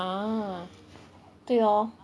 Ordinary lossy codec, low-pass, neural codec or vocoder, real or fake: none; none; none; real